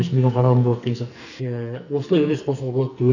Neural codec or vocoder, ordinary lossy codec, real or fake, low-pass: codec, 44.1 kHz, 2.6 kbps, SNAC; none; fake; 7.2 kHz